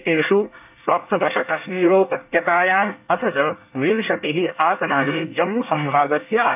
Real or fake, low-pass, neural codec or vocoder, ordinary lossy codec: fake; 3.6 kHz; codec, 24 kHz, 1 kbps, SNAC; AAC, 32 kbps